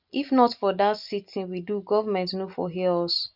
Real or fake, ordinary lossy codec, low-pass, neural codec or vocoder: real; none; 5.4 kHz; none